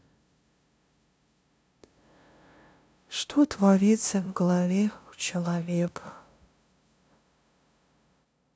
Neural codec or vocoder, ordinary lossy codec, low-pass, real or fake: codec, 16 kHz, 0.5 kbps, FunCodec, trained on LibriTTS, 25 frames a second; none; none; fake